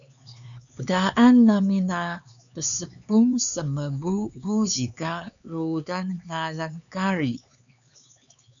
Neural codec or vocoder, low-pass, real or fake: codec, 16 kHz, 4 kbps, X-Codec, HuBERT features, trained on LibriSpeech; 7.2 kHz; fake